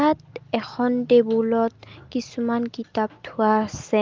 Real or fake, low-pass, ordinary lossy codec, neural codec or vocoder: real; 7.2 kHz; Opus, 32 kbps; none